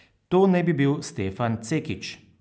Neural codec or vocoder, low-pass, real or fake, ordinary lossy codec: none; none; real; none